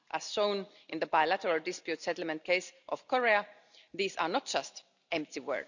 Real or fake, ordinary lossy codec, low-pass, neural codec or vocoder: real; none; 7.2 kHz; none